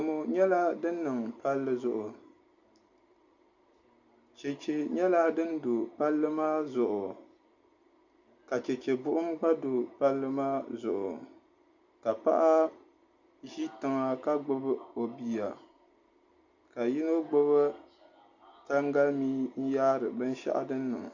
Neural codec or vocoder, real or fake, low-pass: none; real; 7.2 kHz